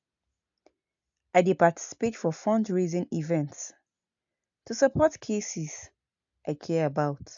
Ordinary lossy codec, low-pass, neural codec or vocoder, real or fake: AAC, 64 kbps; 7.2 kHz; none; real